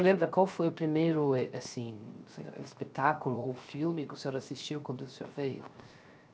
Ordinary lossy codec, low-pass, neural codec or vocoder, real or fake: none; none; codec, 16 kHz, 0.7 kbps, FocalCodec; fake